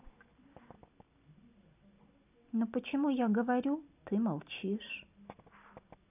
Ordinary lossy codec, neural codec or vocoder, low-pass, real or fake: none; none; 3.6 kHz; real